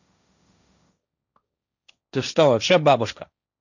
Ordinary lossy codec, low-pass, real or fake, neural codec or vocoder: none; 7.2 kHz; fake; codec, 16 kHz, 1.1 kbps, Voila-Tokenizer